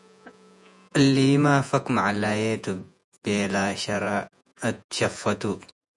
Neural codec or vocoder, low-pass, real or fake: vocoder, 48 kHz, 128 mel bands, Vocos; 10.8 kHz; fake